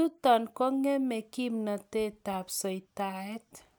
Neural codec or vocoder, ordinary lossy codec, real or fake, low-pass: none; none; real; none